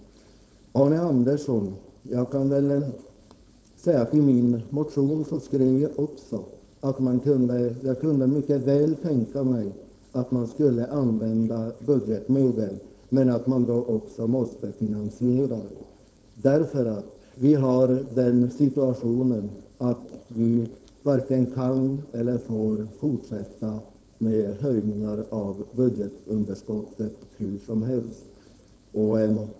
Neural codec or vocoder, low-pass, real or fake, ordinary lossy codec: codec, 16 kHz, 4.8 kbps, FACodec; none; fake; none